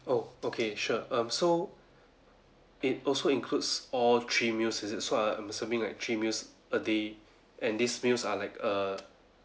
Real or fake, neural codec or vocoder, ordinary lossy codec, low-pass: real; none; none; none